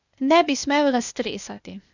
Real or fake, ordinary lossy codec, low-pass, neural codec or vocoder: fake; none; 7.2 kHz; codec, 16 kHz, 0.8 kbps, ZipCodec